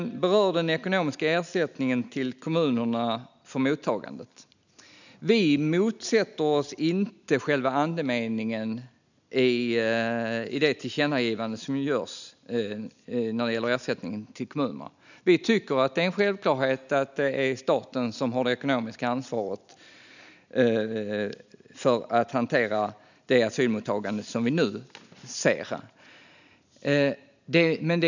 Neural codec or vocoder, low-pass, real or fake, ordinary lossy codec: none; 7.2 kHz; real; none